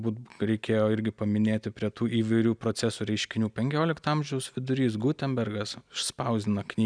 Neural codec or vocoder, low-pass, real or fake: none; 9.9 kHz; real